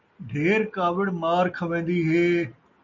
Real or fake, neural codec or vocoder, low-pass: real; none; 7.2 kHz